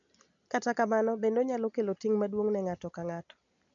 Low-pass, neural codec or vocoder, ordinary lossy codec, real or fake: 7.2 kHz; none; MP3, 96 kbps; real